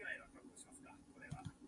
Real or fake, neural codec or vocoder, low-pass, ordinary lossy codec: fake; vocoder, 24 kHz, 100 mel bands, Vocos; 10.8 kHz; AAC, 64 kbps